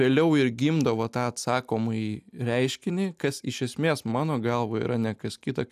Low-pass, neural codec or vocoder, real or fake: 14.4 kHz; none; real